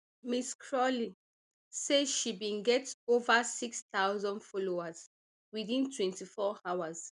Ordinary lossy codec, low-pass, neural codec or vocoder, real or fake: none; 10.8 kHz; none; real